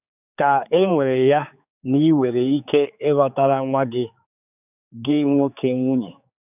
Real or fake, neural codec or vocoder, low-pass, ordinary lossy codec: fake; codec, 16 kHz, 4 kbps, X-Codec, HuBERT features, trained on general audio; 3.6 kHz; none